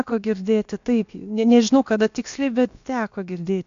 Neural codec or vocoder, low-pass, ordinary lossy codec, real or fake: codec, 16 kHz, about 1 kbps, DyCAST, with the encoder's durations; 7.2 kHz; AAC, 64 kbps; fake